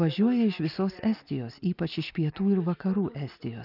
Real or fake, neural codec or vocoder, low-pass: real; none; 5.4 kHz